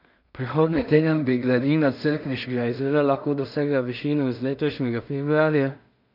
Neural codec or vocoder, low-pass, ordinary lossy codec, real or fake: codec, 16 kHz in and 24 kHz out, 0.4 kbps, LongCat-Audio-Codec, two codebook decoder; 5.4 kHz; none; fake